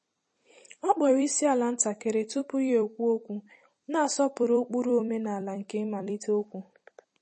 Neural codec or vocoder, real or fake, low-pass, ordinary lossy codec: vocoder, 44.1 kHz, 128 mel bands every 256 samples, BigVGAN v2; fake; 10.8 kHz; MP3, 32 kbps